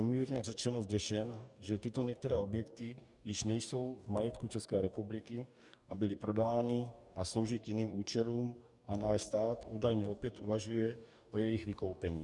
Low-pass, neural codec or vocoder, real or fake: 10.8 kHz; codec, 44.1 kHz, 2.6 kbps, DAC; fake